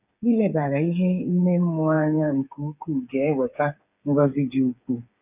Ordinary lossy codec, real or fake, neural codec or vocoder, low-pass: none; fake; codec, 16 kHz, 8 kbps, FreqCodec, smaller model; 3.6 kHz